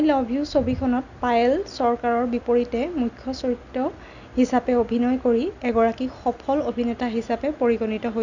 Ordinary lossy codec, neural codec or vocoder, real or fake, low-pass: none; none; real; 7.2 kHz